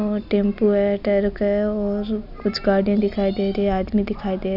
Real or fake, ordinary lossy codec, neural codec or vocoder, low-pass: real; none; none; 5.4 kHz